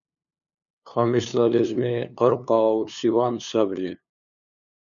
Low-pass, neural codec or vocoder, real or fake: 7.2 kHz; codec, 16 kHz, 2 kbps, FunCodec, trained on LibriTTS, 25 frames a second; fake